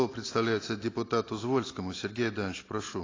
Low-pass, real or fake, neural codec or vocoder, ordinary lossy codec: 7.2 kHz; real; none; AAC, 32 kbps